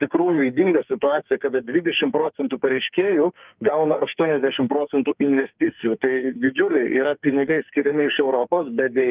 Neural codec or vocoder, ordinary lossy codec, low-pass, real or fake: codec, 44.1 kHz, 2.6 kbps, SNAC; Opus, 32 kbps; 3.6 kHz; fake